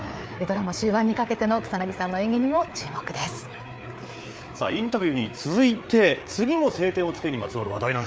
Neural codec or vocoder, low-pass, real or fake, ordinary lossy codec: codec, 16 kHz, 4 kbps, FreqCodec, larger model; none; fake; none